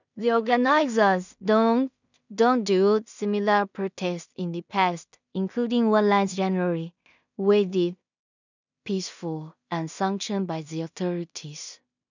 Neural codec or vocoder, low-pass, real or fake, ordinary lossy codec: codec, 16 kHz in and 24 kHz out, 0.4 kbps, LongCat-Audio-Codec, two codebook decoder; 7.2 kHz; fake; none